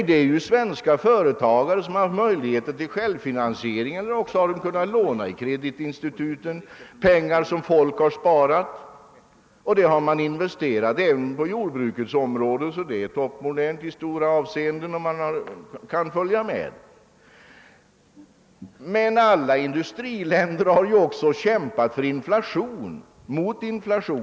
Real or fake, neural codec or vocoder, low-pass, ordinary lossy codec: real; none; none; none